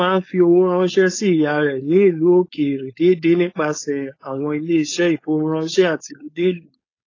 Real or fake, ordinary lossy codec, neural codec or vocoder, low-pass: fake; AAC, 32 kbps; codec, 16 kHz, 4.8 kbps, FACodec; 7.2 kHz